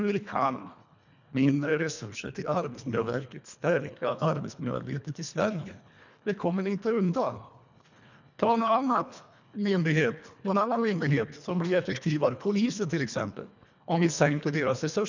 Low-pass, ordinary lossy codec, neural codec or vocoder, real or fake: 7.2 kHz; none; codec, 24 kHz, 1.5 kbps, HILCodec; fake